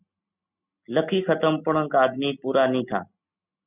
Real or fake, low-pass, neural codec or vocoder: real; 3.6 kHz; none